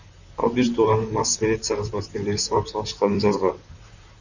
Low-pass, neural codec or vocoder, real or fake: 7.2 kHz; vocoder, 44.1 kHz, 128 mel bands, Pupu-Vocoder; fake